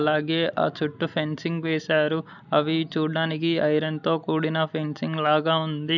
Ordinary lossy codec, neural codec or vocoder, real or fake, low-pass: none; none; real; 7.2 kHz